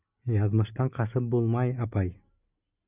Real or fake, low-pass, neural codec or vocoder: real; 3.6 kHz; none